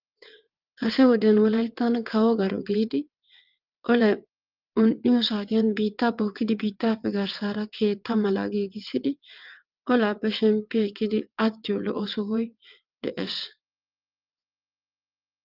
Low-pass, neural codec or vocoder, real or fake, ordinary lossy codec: 5.4 kHz; vocoder, 22.05 kHz, 80 mel bands, WaveNeXt; fake; Opus, 32 kbps